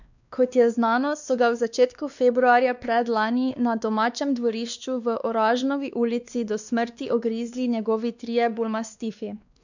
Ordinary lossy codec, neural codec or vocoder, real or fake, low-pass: none; codec, 16 kHz, 2 kbps, X-Codec, WavLM features, trained on Multilingual LibriSpeech; fake; 7.2 kHz